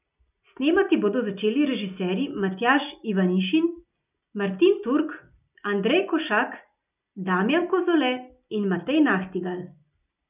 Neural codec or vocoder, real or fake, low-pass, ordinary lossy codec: none; real; 3.6 kHz; none